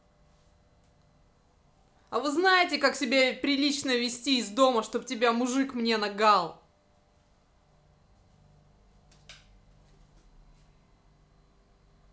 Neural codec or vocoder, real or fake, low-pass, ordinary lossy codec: none; real; none; none